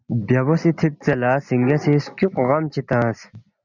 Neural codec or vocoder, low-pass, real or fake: none; 7.2 kHz; real